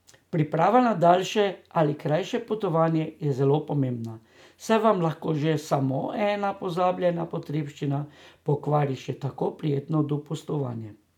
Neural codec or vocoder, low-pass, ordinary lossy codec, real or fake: none; 19.8 kHz; none; real